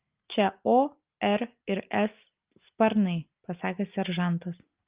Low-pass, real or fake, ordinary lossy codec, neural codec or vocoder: 3.6 kHz; real; Opus, 32 kbps; none